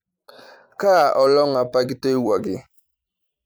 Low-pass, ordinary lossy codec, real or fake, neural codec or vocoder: none; none; fake; vocoder, 44.1 kHz, 128 mel bands every 256 samples, BigVGAN v2